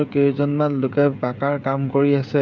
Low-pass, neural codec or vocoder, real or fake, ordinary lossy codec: 7.2 kHz; codec, 16 kHz, 16 kbps, FreqCodec, smaller model; fake; none